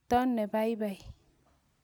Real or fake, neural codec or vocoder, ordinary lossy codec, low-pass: real; none; none; none